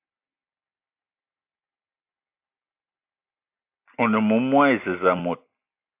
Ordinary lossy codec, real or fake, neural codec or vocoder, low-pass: AAC, 24 kbps; real; none; 3.6 kHz